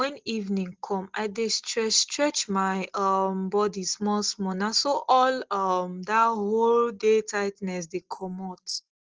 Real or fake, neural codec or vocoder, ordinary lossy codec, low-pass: real; none; Opus, 16 kbps; 7.2 kHz